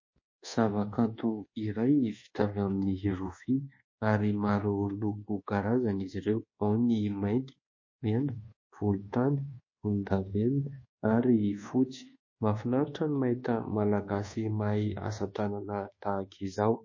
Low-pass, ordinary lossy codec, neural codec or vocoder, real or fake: 7.2 kHz; MP3, 32 kbps; codec, 24 kHz, 1.2 kbps, DualCodec; fake